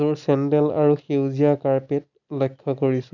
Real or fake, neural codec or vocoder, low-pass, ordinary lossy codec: real; none; 7.2 kHz; none